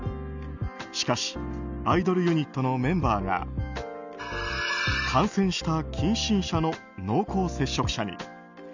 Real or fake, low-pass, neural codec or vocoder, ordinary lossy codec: real; 7.2 kHz; none; none